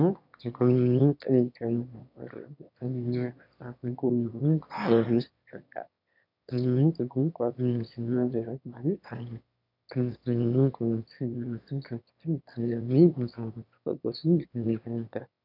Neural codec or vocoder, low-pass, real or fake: autoencoder, 22.05 kHz, a latent of 192 numbers a frame, VITS, trained on one speaker; 5.4 kHz; fake